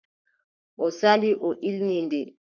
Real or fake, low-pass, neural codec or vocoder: fake; 7.2 kHz; codec, 44.1 kHz, 3.4 kbps, Pupu-Codec